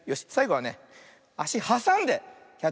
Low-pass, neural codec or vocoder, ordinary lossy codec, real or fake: none; none; none; real